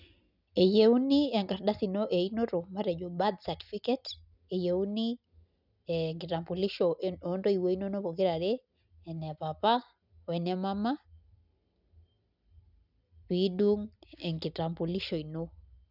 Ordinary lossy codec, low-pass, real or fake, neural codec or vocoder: none; 5.4 kHz; real; none